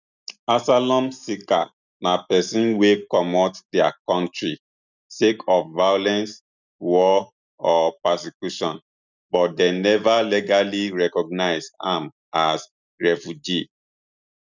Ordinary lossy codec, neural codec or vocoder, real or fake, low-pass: none; none; real; 7.2 kHz